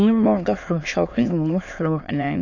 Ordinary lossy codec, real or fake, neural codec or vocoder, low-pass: AAC, 48 kbps; fake; autoencoder, 22.05 kHz, a latent of 192 numbers a frame, VITS, trained on many speakers; 7.2 kHz